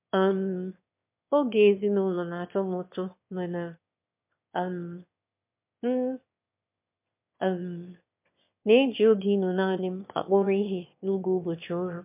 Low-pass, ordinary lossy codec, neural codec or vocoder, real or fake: 3.6 kHz; MP3, 32 kbps; autoencoder, 22.05 kHz, a latent of 192 numbers a frame, VITS, trained on one speaker; fake